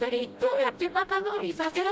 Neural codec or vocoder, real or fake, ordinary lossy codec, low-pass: codec, 16 kHz, 0.5 kbps, FreqCodec, smaller model; fake; none; none